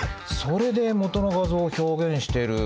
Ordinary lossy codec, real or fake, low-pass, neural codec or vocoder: none; real; none; none